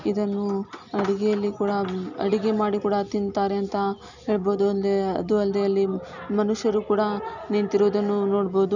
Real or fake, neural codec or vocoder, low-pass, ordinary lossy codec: real; none; 7.2 kHz; none